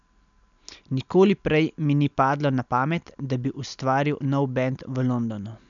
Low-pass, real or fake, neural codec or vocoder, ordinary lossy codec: 7.2 kHz; real; none; MP3, 96 kbps